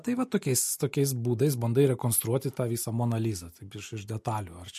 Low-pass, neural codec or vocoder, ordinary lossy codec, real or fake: 14.4 kHz; none; MP3, 64 kbps; real